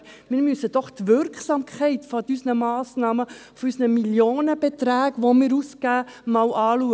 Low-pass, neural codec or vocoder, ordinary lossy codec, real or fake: none; none; none; real